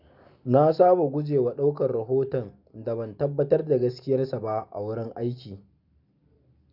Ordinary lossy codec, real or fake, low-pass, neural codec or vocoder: none; real; 5.4 kHz; none